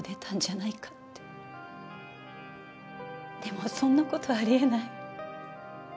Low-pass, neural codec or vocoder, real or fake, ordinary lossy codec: none; none; real; none